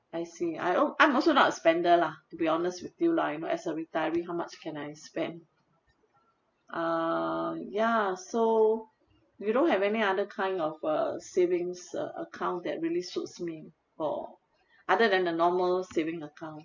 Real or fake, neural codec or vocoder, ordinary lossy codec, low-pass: real; none; MP3, 48 kbps; 7.2 kHz